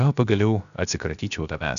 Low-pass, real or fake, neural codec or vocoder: 7.2 kHz; fake; codec, 16 kHz, 0.7 kbps, FocalCodec